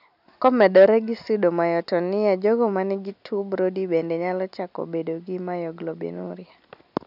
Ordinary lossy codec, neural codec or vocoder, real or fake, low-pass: none; none; real; 5.4 kHz